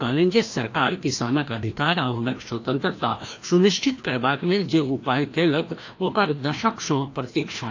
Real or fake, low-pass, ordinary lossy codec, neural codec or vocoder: fake; 7.2 kHz; AAC, 48 kbps; codec, 16 kHz, 1 kbps, FreqCodec, larger model